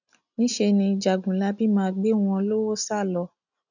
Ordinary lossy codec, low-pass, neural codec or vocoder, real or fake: none; 7.2 kHz; none; real